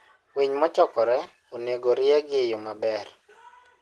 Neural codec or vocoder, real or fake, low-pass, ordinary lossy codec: none; real; 10.8 kHz; Opus, 16 kbps